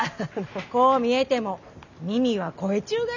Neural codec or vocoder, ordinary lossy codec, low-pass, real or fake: none; none; 7.2 kHz; real